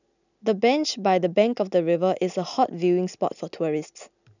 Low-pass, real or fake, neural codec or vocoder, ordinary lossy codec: 7.2 kHz; real; none; none